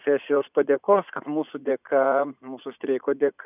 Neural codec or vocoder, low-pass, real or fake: vocoder, 44.1 kHz, 80 mel bands, Vocos; 3.6 kHz; fake